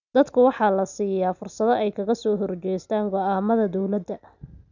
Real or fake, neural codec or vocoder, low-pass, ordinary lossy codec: real; none; 7.2 kHz; none